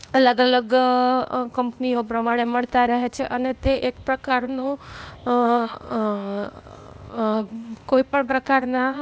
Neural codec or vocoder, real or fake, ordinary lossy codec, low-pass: codec, 16 kHz, 0.8 kbps, ZipCodec; fake; none; none